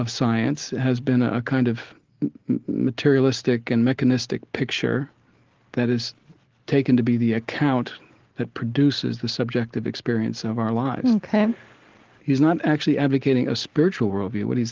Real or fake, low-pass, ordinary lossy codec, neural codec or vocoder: real; 7.2 kHz; Opus, 16 kbps; none